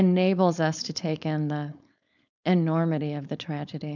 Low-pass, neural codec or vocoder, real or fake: 7.2 kHz; codec, 16 kHz, 4.8 kbps, FACodec; fake